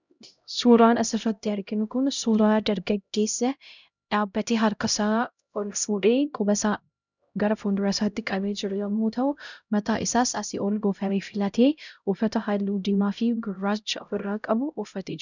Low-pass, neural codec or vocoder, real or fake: 7.2 kHz; codec, 16 kHz, 0.5 kbps, X-Codec, HuBERT features, trained on LibriSpeech; fake